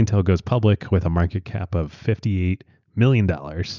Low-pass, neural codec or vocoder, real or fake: 7.2 kHz; none; real